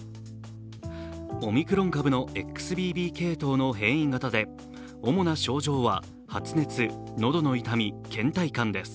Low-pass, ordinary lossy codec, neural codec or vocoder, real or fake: none; none; none; real